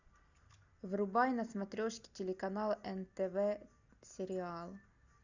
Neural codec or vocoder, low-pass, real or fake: none; 7.2 kHz; real